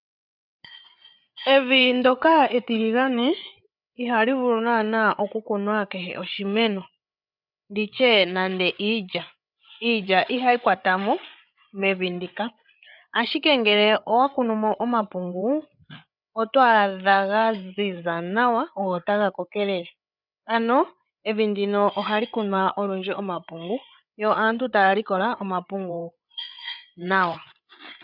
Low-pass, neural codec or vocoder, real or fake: 5.4 kHz; codec, 16 kHz, 8 kbps, FreqCodec, larger model; fake